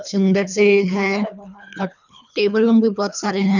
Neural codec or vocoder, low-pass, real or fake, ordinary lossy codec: codec, 24 kHz, 3 kbps, HILCodec; 7.2 kHz; fake; none